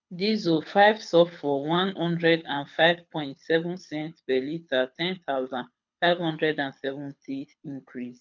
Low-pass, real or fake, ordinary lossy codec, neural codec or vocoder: 7.2 kHz; fake; MP3, 64 kbps; codec, 24 kHz, 6 kbps, HILCodec